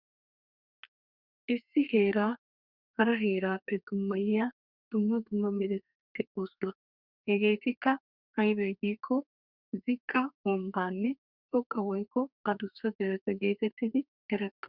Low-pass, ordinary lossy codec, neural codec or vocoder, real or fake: 5.4 kHz; Opus, 64 kbps; codec, 32 kHz, 1.9 kbps, SNAC; fake